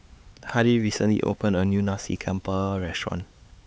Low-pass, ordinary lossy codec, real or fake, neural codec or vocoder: none; none; fake; codec, 16 kHz, 4 kbps, X-Codec, HuBERT features, trained on LibriSpeech